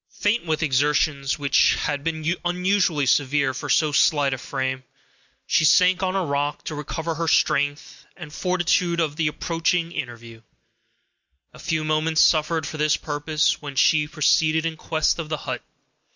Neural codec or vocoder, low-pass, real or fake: none; 7.2 kHz; real